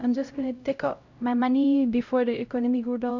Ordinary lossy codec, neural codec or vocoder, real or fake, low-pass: Opus, 64 kbps; codec, 16 kHz, 0.5 kbps, X-Codec, HuBERT features, trained on LibriSpeech; fake; 7.2 kHz